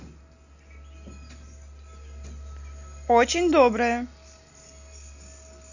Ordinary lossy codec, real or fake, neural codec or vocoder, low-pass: none; real; none; 7.2 kHz